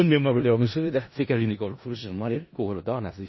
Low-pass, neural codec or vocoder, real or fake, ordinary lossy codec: 7.2 kHz; codec, 16 kHz in and 24 kHz out, 0.4 kbps, LongCat-Audio-Codec, four codebook decoder; fake; MP3, 24 kbps